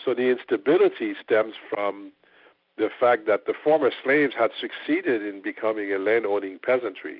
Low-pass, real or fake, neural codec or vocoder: 5.4 kHz; real; none